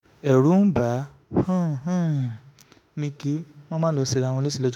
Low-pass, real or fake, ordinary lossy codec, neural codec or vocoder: 19.8 kHz; fake; none; autoencoder, 48 kHz, 32 numbers a frame, DAC-VAE, trained on Japanese speech